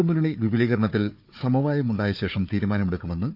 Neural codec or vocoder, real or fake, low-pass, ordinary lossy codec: codec, 16 kHz, 4 kbps, FunCodec, trained on Chinese and English, 50 frames a second; fake; 5.4 kHz; none